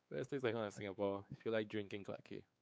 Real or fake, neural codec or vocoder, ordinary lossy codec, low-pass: fake; codec, 16 kHz, 4 kbps, X-Codec, WavLM features, trained on Multilingual LibriSpeech; none; none